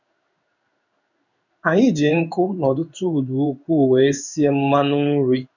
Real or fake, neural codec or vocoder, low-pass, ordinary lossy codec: fake; codec, 16 kHz in and 24 kHz out, 1 kbps, XY-Tokenizer; 7.2 kHz; none